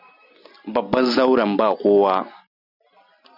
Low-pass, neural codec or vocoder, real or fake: 5.4 kHz; none; real